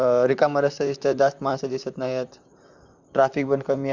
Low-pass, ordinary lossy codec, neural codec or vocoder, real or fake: 7.2 kHz; none; vocoder, 44.1 kHz, 128 mel bands, Pupu-Vocoder; fake